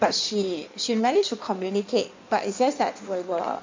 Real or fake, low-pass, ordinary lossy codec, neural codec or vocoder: fake; 7.2 kHz; MP3, 64 kbps; codec, 16 kHz in and 24 kHz out, 1.1 kbps, FireRedTTS-2 codec